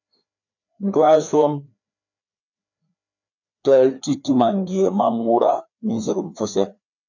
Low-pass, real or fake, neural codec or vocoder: 7.2 kHz; fake; codec, 16 kHz, 2 kbps, FreqCodec, larger model